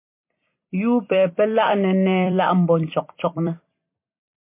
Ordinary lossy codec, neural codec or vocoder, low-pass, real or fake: MP3, 24 kbps; vocoder, 44.1 kHz, 128 mel bands every 256 samples, BigVGAN v2; 3.6 kHz; fake